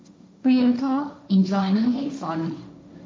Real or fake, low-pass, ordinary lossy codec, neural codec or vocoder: fake; none; none; codec, 16 kHz, 1.1 kbps, Voila-Tokenizer